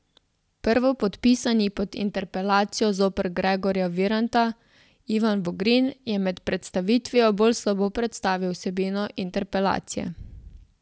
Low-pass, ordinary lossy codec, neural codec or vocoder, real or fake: none; none; none; real